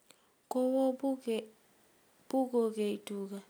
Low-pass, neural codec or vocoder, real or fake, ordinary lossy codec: none; none; real; none